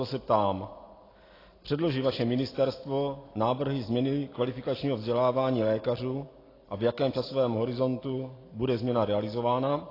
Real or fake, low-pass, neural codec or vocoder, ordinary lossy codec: real; 5.4 kHz; none; AAC, 24 kbps